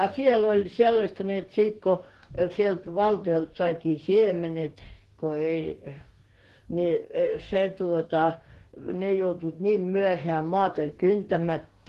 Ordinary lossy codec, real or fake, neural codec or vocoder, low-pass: Opus, 16 kbps; fake; codec, 44.1 kHz, 2.6 kbps, DAC; 14.4 kHz